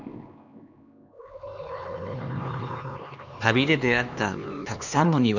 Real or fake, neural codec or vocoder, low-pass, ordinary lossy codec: fake; codec, 16 kHz, 2 kbps, X-Codec, HuBERT features, trained on LibriSpeech; 7.2 kHz; none